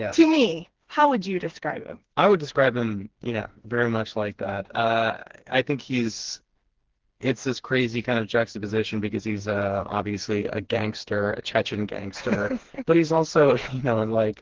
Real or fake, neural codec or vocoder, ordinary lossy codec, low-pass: fake; codec, 16 kHz, 2 kbps, FreqCodec, smaller model; Opus, 16 kbps; 7.2 kHz